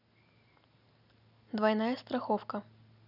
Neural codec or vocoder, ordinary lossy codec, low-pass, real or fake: none; none; 5.4 kHz; real